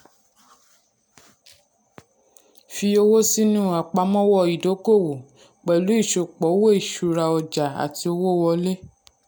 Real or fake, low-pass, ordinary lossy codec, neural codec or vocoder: real; none; none; none